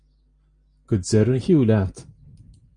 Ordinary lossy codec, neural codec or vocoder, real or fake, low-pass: Opus, 32 kbps; none; real; 9.9 kHz